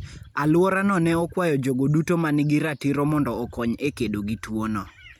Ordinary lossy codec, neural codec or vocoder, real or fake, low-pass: none; none; real; 19.8 kHz